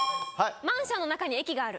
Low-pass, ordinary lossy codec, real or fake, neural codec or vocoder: none; none; real; none